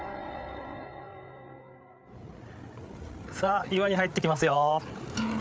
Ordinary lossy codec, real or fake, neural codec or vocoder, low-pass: none; fake; codec, 16 kHz, 8 kbps, FreqCodec, larger model; none